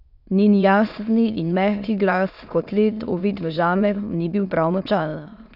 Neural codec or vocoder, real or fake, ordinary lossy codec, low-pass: autoencoder, 22.05 kHz, a latent of 192 numbers a frame, VITS, trained on many speakers; fake; none; 5.4 kHz